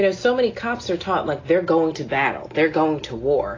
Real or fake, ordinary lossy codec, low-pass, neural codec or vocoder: real; AAC, 32 kbps; 7.2 kHz; none